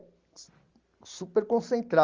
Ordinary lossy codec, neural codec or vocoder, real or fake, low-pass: Opus, 32 kbps; none; real; 7.2 kHz